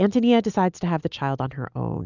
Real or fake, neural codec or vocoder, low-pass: real; none; 7.2 kHz